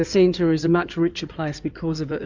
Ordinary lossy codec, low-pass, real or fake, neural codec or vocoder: Opus, 64 kbps; 7.2 kHz; fake; codec, 16 kHz in and 24 kHz out, 2.2 kbps, FireRedTTS-2 codec